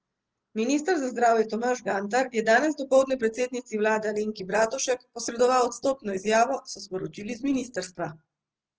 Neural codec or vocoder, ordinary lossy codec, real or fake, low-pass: none; Opus, 16 kbps; real; 7.2 kHz